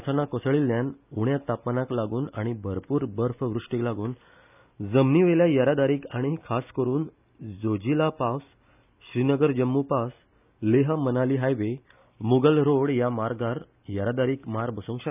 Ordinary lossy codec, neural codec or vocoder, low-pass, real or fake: none; none; 3.6 kHz; real